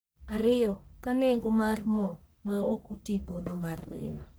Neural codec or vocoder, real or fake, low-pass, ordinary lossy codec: codec, 44.1 kHz, 1.7 kbps, Pupu-Codec; fake; none; none